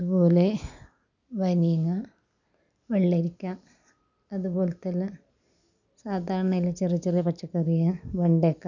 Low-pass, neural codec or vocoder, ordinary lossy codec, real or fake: 7.2 kHz; none; none; real